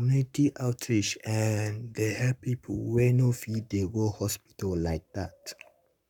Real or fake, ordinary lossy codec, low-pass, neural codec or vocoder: fake; none; 19.8 kHz; codec, 44.1 kHz, 7.8 kbps, Pupu-Codec